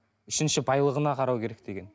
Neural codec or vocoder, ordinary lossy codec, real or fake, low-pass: none; none; real; none